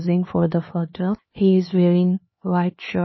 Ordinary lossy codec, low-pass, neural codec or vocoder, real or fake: MP3, 24 kbps; 7.2 kHz; codec, 16 kHz, 2 kbps, X-Codec, HuBERT features, trained on LibriSpeech; fake